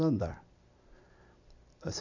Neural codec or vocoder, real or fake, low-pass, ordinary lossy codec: none; real; 7.2 kHz; none